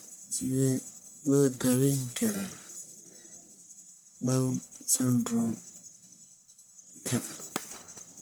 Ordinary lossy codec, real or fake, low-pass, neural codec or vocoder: none; fake; none; codec, 44.1 kHz, 1.7 kbps, Pupu-Codec